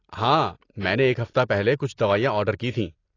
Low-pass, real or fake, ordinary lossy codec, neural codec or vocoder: 7.2 kHz; real; AAC, 32 kbps; none